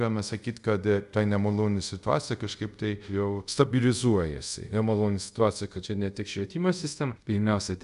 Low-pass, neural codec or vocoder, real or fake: 10.8 kHz; codec, 24 kHz, 0.5 kbps, DualCodec; fake